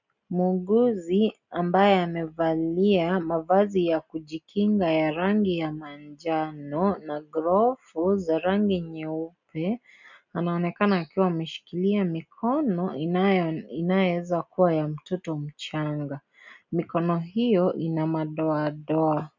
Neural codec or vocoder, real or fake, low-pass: none; real; 7.2 kHz